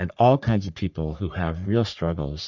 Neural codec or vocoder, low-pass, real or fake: codec, 44.1 kHz, 3.4 kbps, Pupu-Codec; 7.2 kHz; fake